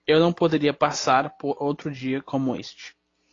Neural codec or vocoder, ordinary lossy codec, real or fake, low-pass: none; AAC, 32 kbps; real; 7.2 kHz